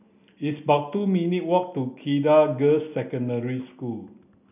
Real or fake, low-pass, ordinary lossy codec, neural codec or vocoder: real; 3.6 kHz; none; none